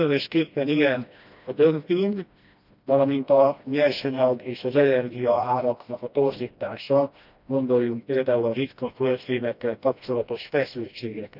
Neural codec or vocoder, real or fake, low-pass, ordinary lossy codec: codec, 16 kHz, 1 kbps, FreqCodec, smaller model; fake; 5.4 kHz; none